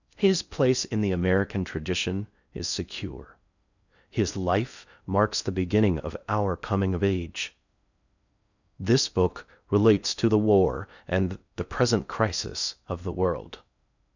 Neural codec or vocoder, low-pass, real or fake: codec, 16 kHz in and 24 kHz out, 0.6 kbps, FocalCodec, streaming, 4096 codes; 7.2 kHz; fake